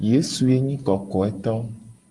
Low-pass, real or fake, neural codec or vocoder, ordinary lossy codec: 10.8 kHz; real; none; Opus, 24 kbps